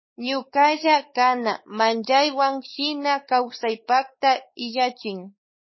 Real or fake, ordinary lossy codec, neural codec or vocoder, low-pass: fake; MP3, 24 kbps; codec, 16 kHz, 4 kbps, X-Codec, WavLM features, trained on Multilingual LibriSpeech; 7.2 kHz